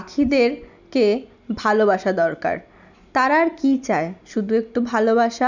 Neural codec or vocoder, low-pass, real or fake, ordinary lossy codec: none; 7.2 kHz; real; none